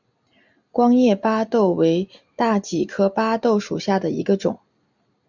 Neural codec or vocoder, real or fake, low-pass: none; real; 7.2 kHz